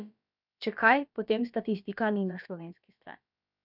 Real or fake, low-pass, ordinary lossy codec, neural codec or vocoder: fake; 5.4 kHz; none; codec, 16 kHz, about 1 kbps, DyCAST, with the encoder's durations